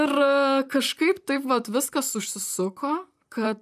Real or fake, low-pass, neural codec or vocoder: fake; 14.4 kHz; vocoder, 44.1 kHz, 128 mel bands, Pupu-Vocoder